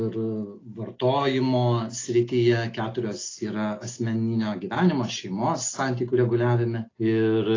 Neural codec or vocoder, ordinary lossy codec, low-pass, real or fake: none; AAC, 32 kbps; 7.2 kHz; real